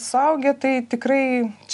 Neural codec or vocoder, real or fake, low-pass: none; real; 10.8 kHz